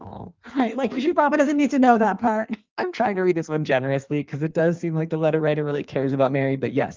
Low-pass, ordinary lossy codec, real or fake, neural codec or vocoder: 7.2 kHz; Opus, 24 kbps; fake; codec, 16 kHz in and 24 kHz out, 1.1 kbps, FireRedTTS-2 codec